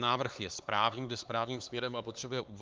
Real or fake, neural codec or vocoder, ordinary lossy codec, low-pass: fake; codec, 16 kHz, 4 kbps, X-Codec, HuBERT features, trained on LibriSpeech; Opus, 24 kbps; 7.2 kHz